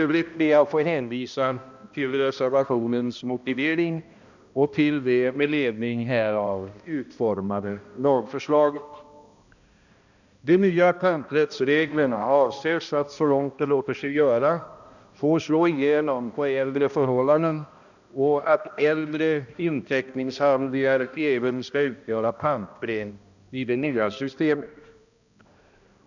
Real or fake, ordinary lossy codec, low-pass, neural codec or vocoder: fake; none; 7.2 kHz; codec, 16 kHz, 1 kbps, X-Codec, HuBERT features, trained on balanced general audio